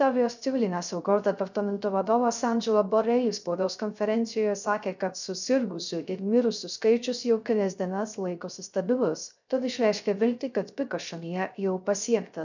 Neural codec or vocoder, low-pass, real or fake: codec, 16 kHz, 0.3 kbps, FocalCodec; 7.2 kHz; fake